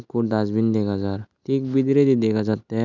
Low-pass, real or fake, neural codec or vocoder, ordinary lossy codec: 7.2 kHz; real; none; none